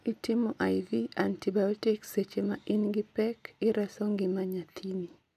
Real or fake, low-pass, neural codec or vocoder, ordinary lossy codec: real; 14.4 kHz; none; none